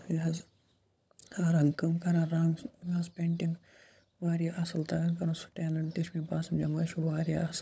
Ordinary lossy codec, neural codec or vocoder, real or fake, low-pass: none; codec, 16 kHz, 16 kbps, FunCodec, trained on LibriTTS, 50 frames a second; fake; none